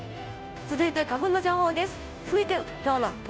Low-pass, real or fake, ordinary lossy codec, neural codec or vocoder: none; fake; none; codec, 16 kHz, 0.5 kbps, FunCodec, trained on Chinese and English, 25 frames a second